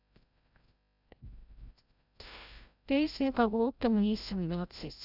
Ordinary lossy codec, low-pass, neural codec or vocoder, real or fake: none; 5.4 kHz; codec, 16 kHz, 0.5 kbps, FreqCodec, larger model; fake